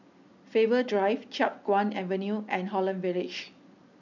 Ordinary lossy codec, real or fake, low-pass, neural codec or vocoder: none; real; 7.2 kHz; none